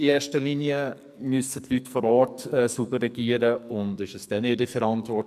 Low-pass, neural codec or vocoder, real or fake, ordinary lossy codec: 14.4 kHz; codec, 44.1 kHz, 2.6 kbps, SNAC; fake; none